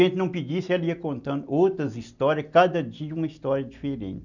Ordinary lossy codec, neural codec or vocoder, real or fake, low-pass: none; none; real; 7.2 kHz